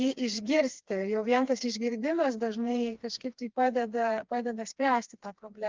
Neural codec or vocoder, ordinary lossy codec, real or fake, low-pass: codec, 16 kHz, 2 kbps, FreqCodec, smaller model; Opus, 32 kbps; fake; 7.2 kHz